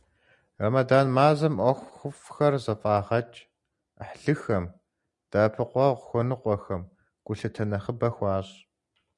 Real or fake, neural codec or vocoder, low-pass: real; none; 10.8 kHz